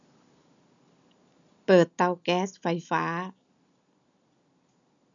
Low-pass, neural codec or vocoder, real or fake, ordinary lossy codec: 7.2 kHz; none; real; none